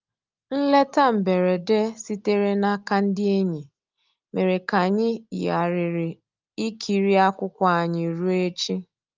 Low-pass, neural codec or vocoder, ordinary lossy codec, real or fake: 7.2 kHz; none; Opus, 24 kbps; real